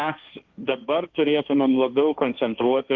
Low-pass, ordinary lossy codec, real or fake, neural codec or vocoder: 7.2 kHz; Opus, 24 kbps; fake; codec, 16 kHz, 1.1 kbps, Voila-Tokenizer